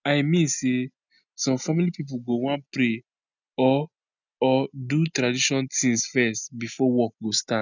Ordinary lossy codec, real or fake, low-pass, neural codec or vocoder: none; real; 7.2 kHz; none